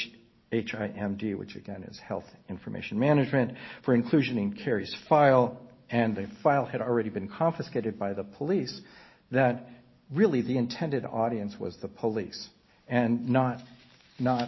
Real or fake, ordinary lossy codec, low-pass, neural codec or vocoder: real; MP3, 24 kbps; 7.2 kHz; none